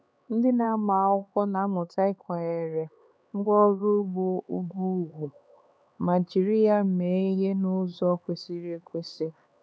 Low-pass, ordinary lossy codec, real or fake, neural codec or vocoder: none; none; fake; codec, 16 kHz, 4 kbps, X-Codec, HuBERT features, trained on LibriSpeech